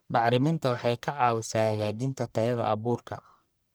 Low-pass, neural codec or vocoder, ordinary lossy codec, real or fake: none; codec, 44.1 kHz, 1.7 kbps, Pupu-Codec; none; fake